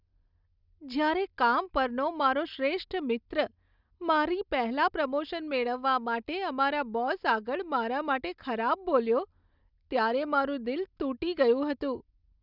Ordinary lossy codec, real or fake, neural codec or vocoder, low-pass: none; real; none; 5.4 kHz